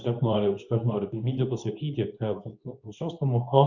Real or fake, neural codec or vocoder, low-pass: fake; codec, 24 kHz, 0.9 kbps, WavTokenizer, medium speech release version 2; 7.2 kHz